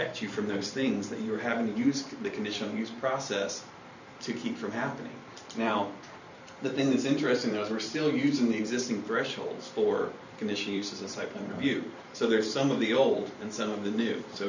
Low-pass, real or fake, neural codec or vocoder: 7.2 kHz; fake; vocoder, 44.1 kHz, 128 mel bands every 512 samples, BigVGAN v2